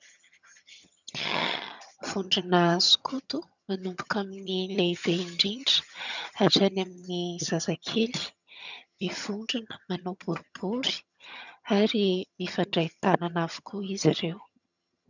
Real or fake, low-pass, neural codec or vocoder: fake; 7.2 kHz; vocoder, 22.05 kHz, 80 mel bands, HiFi-GAN